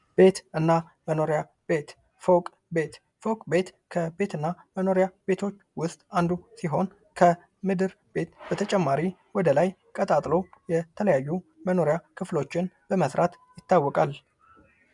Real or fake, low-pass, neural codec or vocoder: real; 10.8 kHz; none